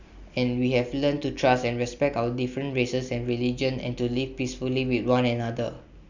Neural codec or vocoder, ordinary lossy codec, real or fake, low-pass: none; none; real; 7.2 kHz